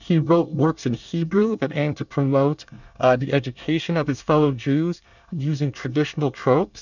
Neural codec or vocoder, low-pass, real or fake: codec, 24 kHz, 1 kbps, SNAC; 7.2 kHz; fake